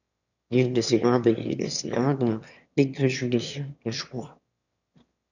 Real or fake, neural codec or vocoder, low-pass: fake; autoencoder, 22.05 kHz, a latent of 192 numbers a frame, VITS, trained on one speaker; 7.2 kHz